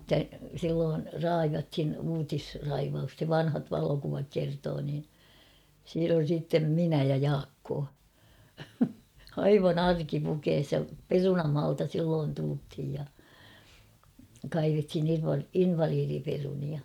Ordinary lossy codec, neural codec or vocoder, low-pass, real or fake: MP3, 96 kbps; none; 19.8 kHz; real